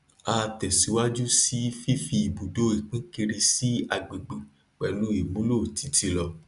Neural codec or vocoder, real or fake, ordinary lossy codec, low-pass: none; real; none; 10.8 kHz